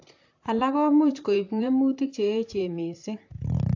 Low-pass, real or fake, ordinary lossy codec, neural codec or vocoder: 7.2 kHz; fake; AAC, 48 kbps; vocoder, 44.1 kHz, 128 mel bands, Pupu-Vocoder